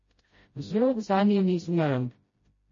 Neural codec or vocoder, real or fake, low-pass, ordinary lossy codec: codec, 16 kHz, 0.5 kbps, FreqCodec, smaller model; fake; 7.2 kHz; MP3, 32 kbps